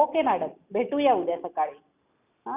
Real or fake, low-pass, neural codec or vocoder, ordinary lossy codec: real; 3.6 kHz; none; none